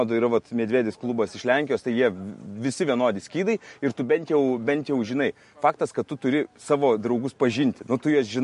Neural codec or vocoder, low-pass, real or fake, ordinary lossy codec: none; 14.4 kHz; real; MP3, 48 kbps